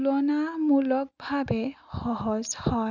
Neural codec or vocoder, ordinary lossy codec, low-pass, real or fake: none; none; 7.2 kHz; real